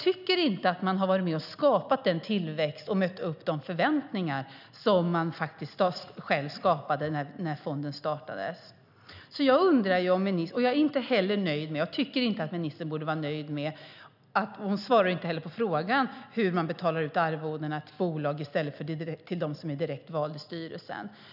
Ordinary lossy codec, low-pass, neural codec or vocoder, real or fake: none; 5.4 kHz; none; real